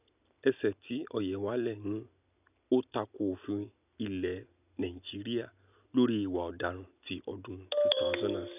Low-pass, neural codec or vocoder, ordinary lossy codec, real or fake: 3.6 kHz; none; none; real